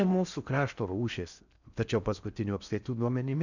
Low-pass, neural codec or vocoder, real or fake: 7.2 kHz; codec, 16 kHz in and 24 kHz out, 0.6 kbps, FocalCodec, streaming, 4096 codes; fake